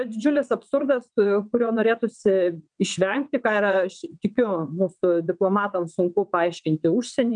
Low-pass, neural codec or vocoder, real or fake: 9.9 kHz; vocoder, 22.05 kHz, 80 mel bands, WaveNeXt; fake